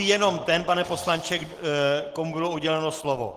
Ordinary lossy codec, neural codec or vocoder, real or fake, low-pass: Opus, 16 kbps; none; real; 14.4 kHz